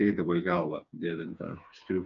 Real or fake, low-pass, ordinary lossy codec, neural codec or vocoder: fake; 7.2 kHz; MP3, 96 kbps; codec, 16 kHz, 4 kbps, FreqCodec, smaller model